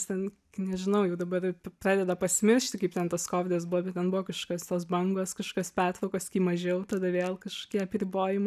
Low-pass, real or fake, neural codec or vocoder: 14.4 kHz; real; none